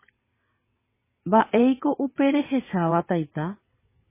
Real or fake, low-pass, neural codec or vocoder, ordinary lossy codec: fake; 3.6 kHz; vocoder, 22.05 kHz, 80 mel bands, WaveNeXt; MP3, 16 kbps